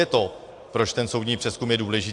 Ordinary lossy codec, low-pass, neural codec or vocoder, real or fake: AAC, 64 kbps; 10.8 kHz; none; real